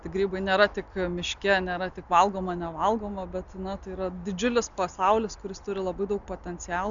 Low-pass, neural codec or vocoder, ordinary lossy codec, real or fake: 7.2 kHz; none; MP3, 96 kbps; real